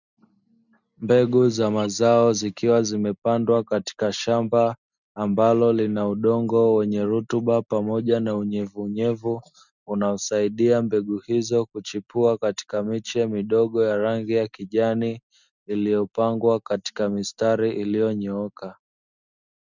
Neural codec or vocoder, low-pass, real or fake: none; 7.2 kHz; real